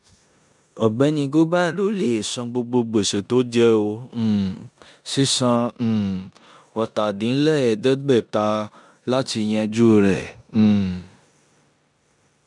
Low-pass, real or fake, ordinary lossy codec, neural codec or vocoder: 10.8 kHz; fake; none; codec, 16 kHz in and 24 kHz out, 0.9 kbps, LongCat-Audio-Codec, four codebook decoder